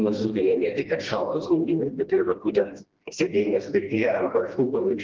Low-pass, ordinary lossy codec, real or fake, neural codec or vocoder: 7.2 kHz; Opus, 16 kbps; fake; codec, 16 kHz, 1 kbps, FreqCodec, smaller model